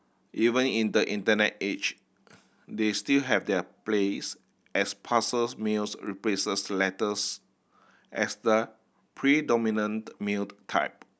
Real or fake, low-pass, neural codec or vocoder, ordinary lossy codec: real; none; none; none